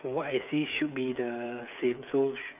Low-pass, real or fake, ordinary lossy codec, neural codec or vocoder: 3.6 kHz; fake; none; codec, 16 kHz, 8 kbps, FreqCodec, smaller model